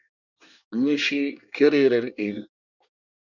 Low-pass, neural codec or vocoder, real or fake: 7.2 kHz; codec, 24 kHz, 1 kbps, SNAC; fake